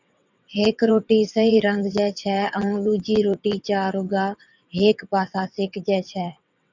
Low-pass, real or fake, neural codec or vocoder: 7.2 kHz; fake; vocoder, 22.05 kHz, 80 mel bands, WaveNeXt